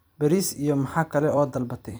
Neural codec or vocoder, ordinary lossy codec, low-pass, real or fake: vocoder, 44.1 kHz, 128 mel bands every 256 samples, BigVGAN v2; none; none; fake